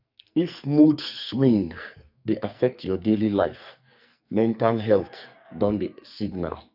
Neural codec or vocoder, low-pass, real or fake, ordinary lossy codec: codec, 44.1 kHz, 2.6 kbps, SNAC; 5.4 kHz; fake; none